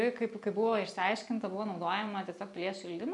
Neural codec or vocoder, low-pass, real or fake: none; 10.8 kHz; real